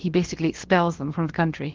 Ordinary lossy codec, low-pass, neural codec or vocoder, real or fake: Opus, 16 kbps; 7.2 kHz; codec, 16 kHz, about 1 kbps, DyCAST, with the encoder's durations; fake